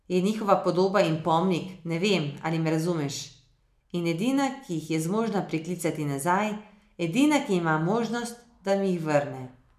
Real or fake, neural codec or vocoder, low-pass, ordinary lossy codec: real; none; 14.4 kHz; none